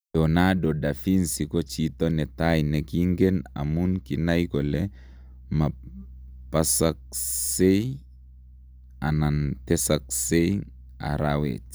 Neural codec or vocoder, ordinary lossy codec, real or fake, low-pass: none; none; real; none